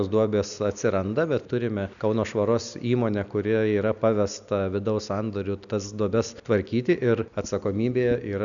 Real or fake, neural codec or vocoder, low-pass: real; none; 7.2 kHz